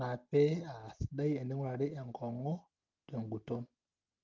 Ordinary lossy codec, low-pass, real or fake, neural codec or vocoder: Opus, 32 kbps; 7.2 kHz; real; none